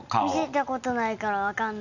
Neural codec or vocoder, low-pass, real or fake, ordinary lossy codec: none; 7.2 kHz; real; none